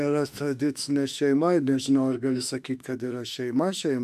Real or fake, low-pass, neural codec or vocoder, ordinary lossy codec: fake; 14.4 kHz; autoencoder, 48 kHz, 32 numbers a frame, DAC-VAE, trained on Japanese speech; AAC, 96 kbps